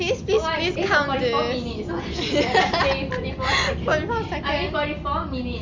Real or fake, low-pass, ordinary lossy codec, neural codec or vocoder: real; 7.2 kHz; MP3, 64 kbps; none